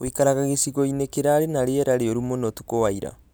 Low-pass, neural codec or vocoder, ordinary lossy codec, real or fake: none; none; none; real